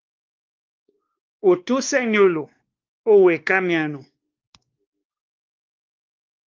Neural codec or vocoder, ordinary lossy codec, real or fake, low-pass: codec, 16 kHz, 2 kbps, X-Codec, WavLM features, trained on Multilingual LibriSpeech; Opus, 32 kbps; fake; 7.2 kHz